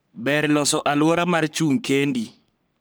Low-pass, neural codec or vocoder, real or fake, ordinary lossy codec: none; codec, 44.1 kHz, 3.4 kbps, Pupu-Codec; fake; none